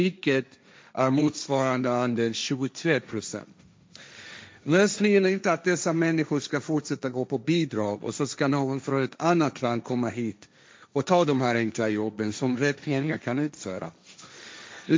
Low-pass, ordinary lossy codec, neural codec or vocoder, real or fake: none; none; codec, 16 kHz, 1.1 kbps, Voila-Tokenizer; fake